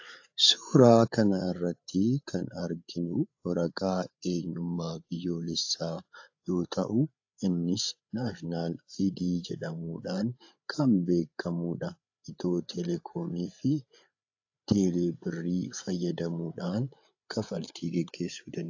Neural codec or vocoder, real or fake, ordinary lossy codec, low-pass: codec, 16 kHz, 8 kbps, FreqCodec, larger model; fake; AAC, 48 kbps; 7.2 kHz